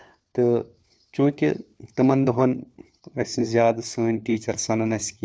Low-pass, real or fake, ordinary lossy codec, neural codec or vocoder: none; fake; none; codec, 16 kHz, 4 kbps, FunCodec, trained on LibriTTS, 50 frames a second